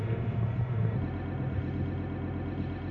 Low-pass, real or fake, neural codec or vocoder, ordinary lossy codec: 7.2 kHz; fake; codec, 16 kHz, 0.4 kbps, LongCat-Audio-Codec; none